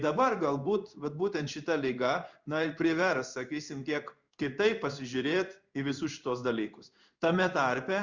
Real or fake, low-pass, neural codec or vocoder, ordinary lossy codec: fake; 7.2 kHz; codec, 16 kHz in and 24 kHz out, 1 kbps, XY-Tokenizer; Opus, 64 kbps